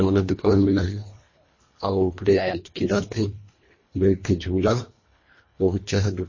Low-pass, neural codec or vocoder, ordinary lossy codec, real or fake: 7.2 kHz; codec, 24 kHz, 1.5 kbps, HILCodec; MP3, 32 kbps; fake